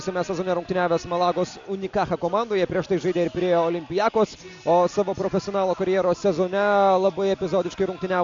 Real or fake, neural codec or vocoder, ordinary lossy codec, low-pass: real; none; AAC, 64 kbps; 7.2 kHz